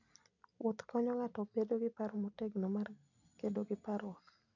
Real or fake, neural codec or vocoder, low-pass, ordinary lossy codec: real; none; 7.2 kHz; none